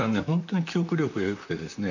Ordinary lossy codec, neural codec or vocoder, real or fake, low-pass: none; vocoder, 44.1 kHz, 128 mel bands, Pupu-Vocoder; fake; 7.2 kHz